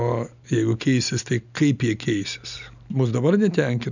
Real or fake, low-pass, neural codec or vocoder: real; 7.2 kHz; none